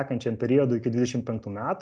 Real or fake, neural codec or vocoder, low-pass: real; none; 9.9 kHz